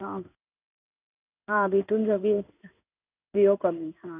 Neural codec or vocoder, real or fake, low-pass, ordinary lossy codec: codec, 16 kHz in and 24 kHz out, 1 kbps, XY-Tokenizer; fake; 3.6 kHz; none